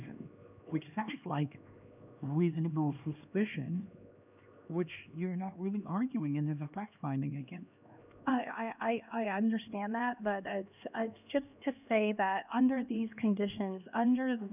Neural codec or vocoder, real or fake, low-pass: codec, 16 kHz, 2 kbps, X-Codec, HuBERT features, trained on LibriSpeech; fake; 3.6 kHz